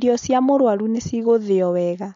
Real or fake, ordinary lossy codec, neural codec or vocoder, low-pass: real; MP3, 48 kbps; none; 7.2 kHz